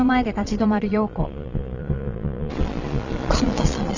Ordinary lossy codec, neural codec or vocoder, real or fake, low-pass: none; vocoder, 22.05 kHz, 80 mel bands, Vocos; fake; 7.2 kHz